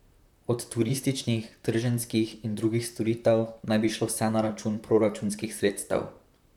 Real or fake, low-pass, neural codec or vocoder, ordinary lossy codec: fake; 19.8 kHz; vocoder, 44.1 kHz, 128 mel bands, Pupu-Vocoder; none